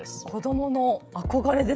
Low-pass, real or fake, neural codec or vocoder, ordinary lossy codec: none; fake; codec, 16 kHz, 16 kbps, FreqCodec, smaller model; none